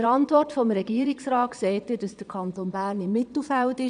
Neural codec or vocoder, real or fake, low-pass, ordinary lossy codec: vocoder, 22.05 kHz, 80 mel bands, Vocos; fake; 9.9 kHz; none